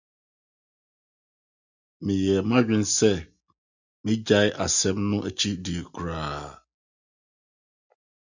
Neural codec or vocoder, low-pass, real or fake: none; 7.2 kHz; real